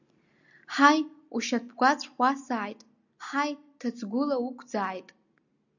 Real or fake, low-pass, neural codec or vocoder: real; 7.2 kHz; none